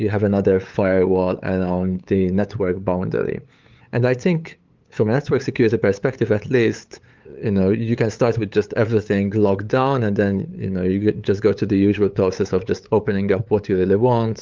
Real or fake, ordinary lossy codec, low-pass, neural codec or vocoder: fake; Opus, 32 kbps; 7.2 kHz; codec, 16 kHz, 8 kbps, FunCodec, trained on LibriTTS, 25 frames a second